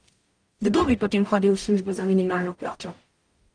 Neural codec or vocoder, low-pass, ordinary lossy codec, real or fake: codec, 44.1 kHz, 0.9 kbps, DAC; 9.9 kHz; Opus, 24 kbps; fake